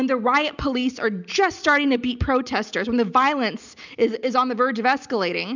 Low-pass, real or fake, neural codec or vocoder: 7.2 kHz; real; none